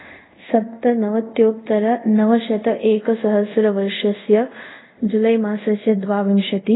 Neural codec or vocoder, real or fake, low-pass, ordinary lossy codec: codec, 24 kHz, 0.5 kbps, DualCodec; fake; 7.2 kHz; AAC, 16 kbps